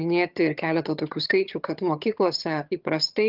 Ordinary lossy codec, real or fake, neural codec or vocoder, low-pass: Opus, 32 kbps; fake; vocoder, 22.05 kHz, 80 mel bands, HiFi-GAN; 5.4 kHz